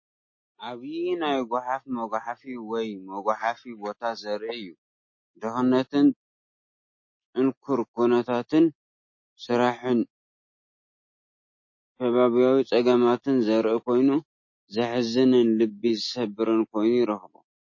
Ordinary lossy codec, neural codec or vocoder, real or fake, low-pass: MP3, 32 kbps; none; real; 7.2 kHz